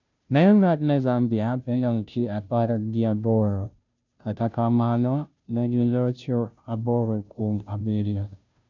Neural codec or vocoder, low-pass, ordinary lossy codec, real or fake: codec, 16 kHz, 0.5 kbps, FunCodec, trained on Chinese and English, 25 frames a second; 7.2 kHz; none; fake